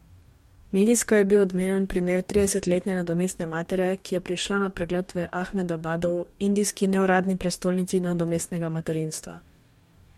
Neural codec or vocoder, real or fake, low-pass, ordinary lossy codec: codec, 44.1 kHz, 2.6 kbps, DAC; fake; 19.8 kHz; MP3, 64 kbps